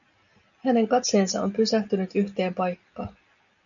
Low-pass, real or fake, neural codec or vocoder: 7.2 kHz; real; none